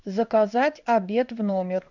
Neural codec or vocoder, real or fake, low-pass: codec, 16 kHz in and 24 kHz out, 1 kbps, XY-Tokenizer; fake; 7.2 kHz